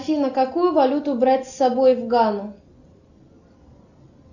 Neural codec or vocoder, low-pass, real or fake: none; 7.2 kHz; real